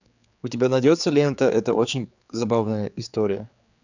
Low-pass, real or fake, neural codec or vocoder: 7.2 kHz; fake; codec, 16 kHz, 4 kbps, X-Codec, HuBERT features, trained on general audio